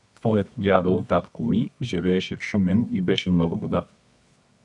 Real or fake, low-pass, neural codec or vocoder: fake; 10.8 kHz; codec, 24 kHz, 0.9 kbps, WavTokenizer, medium music audio release